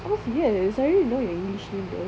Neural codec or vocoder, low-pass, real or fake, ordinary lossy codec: none; none; real; none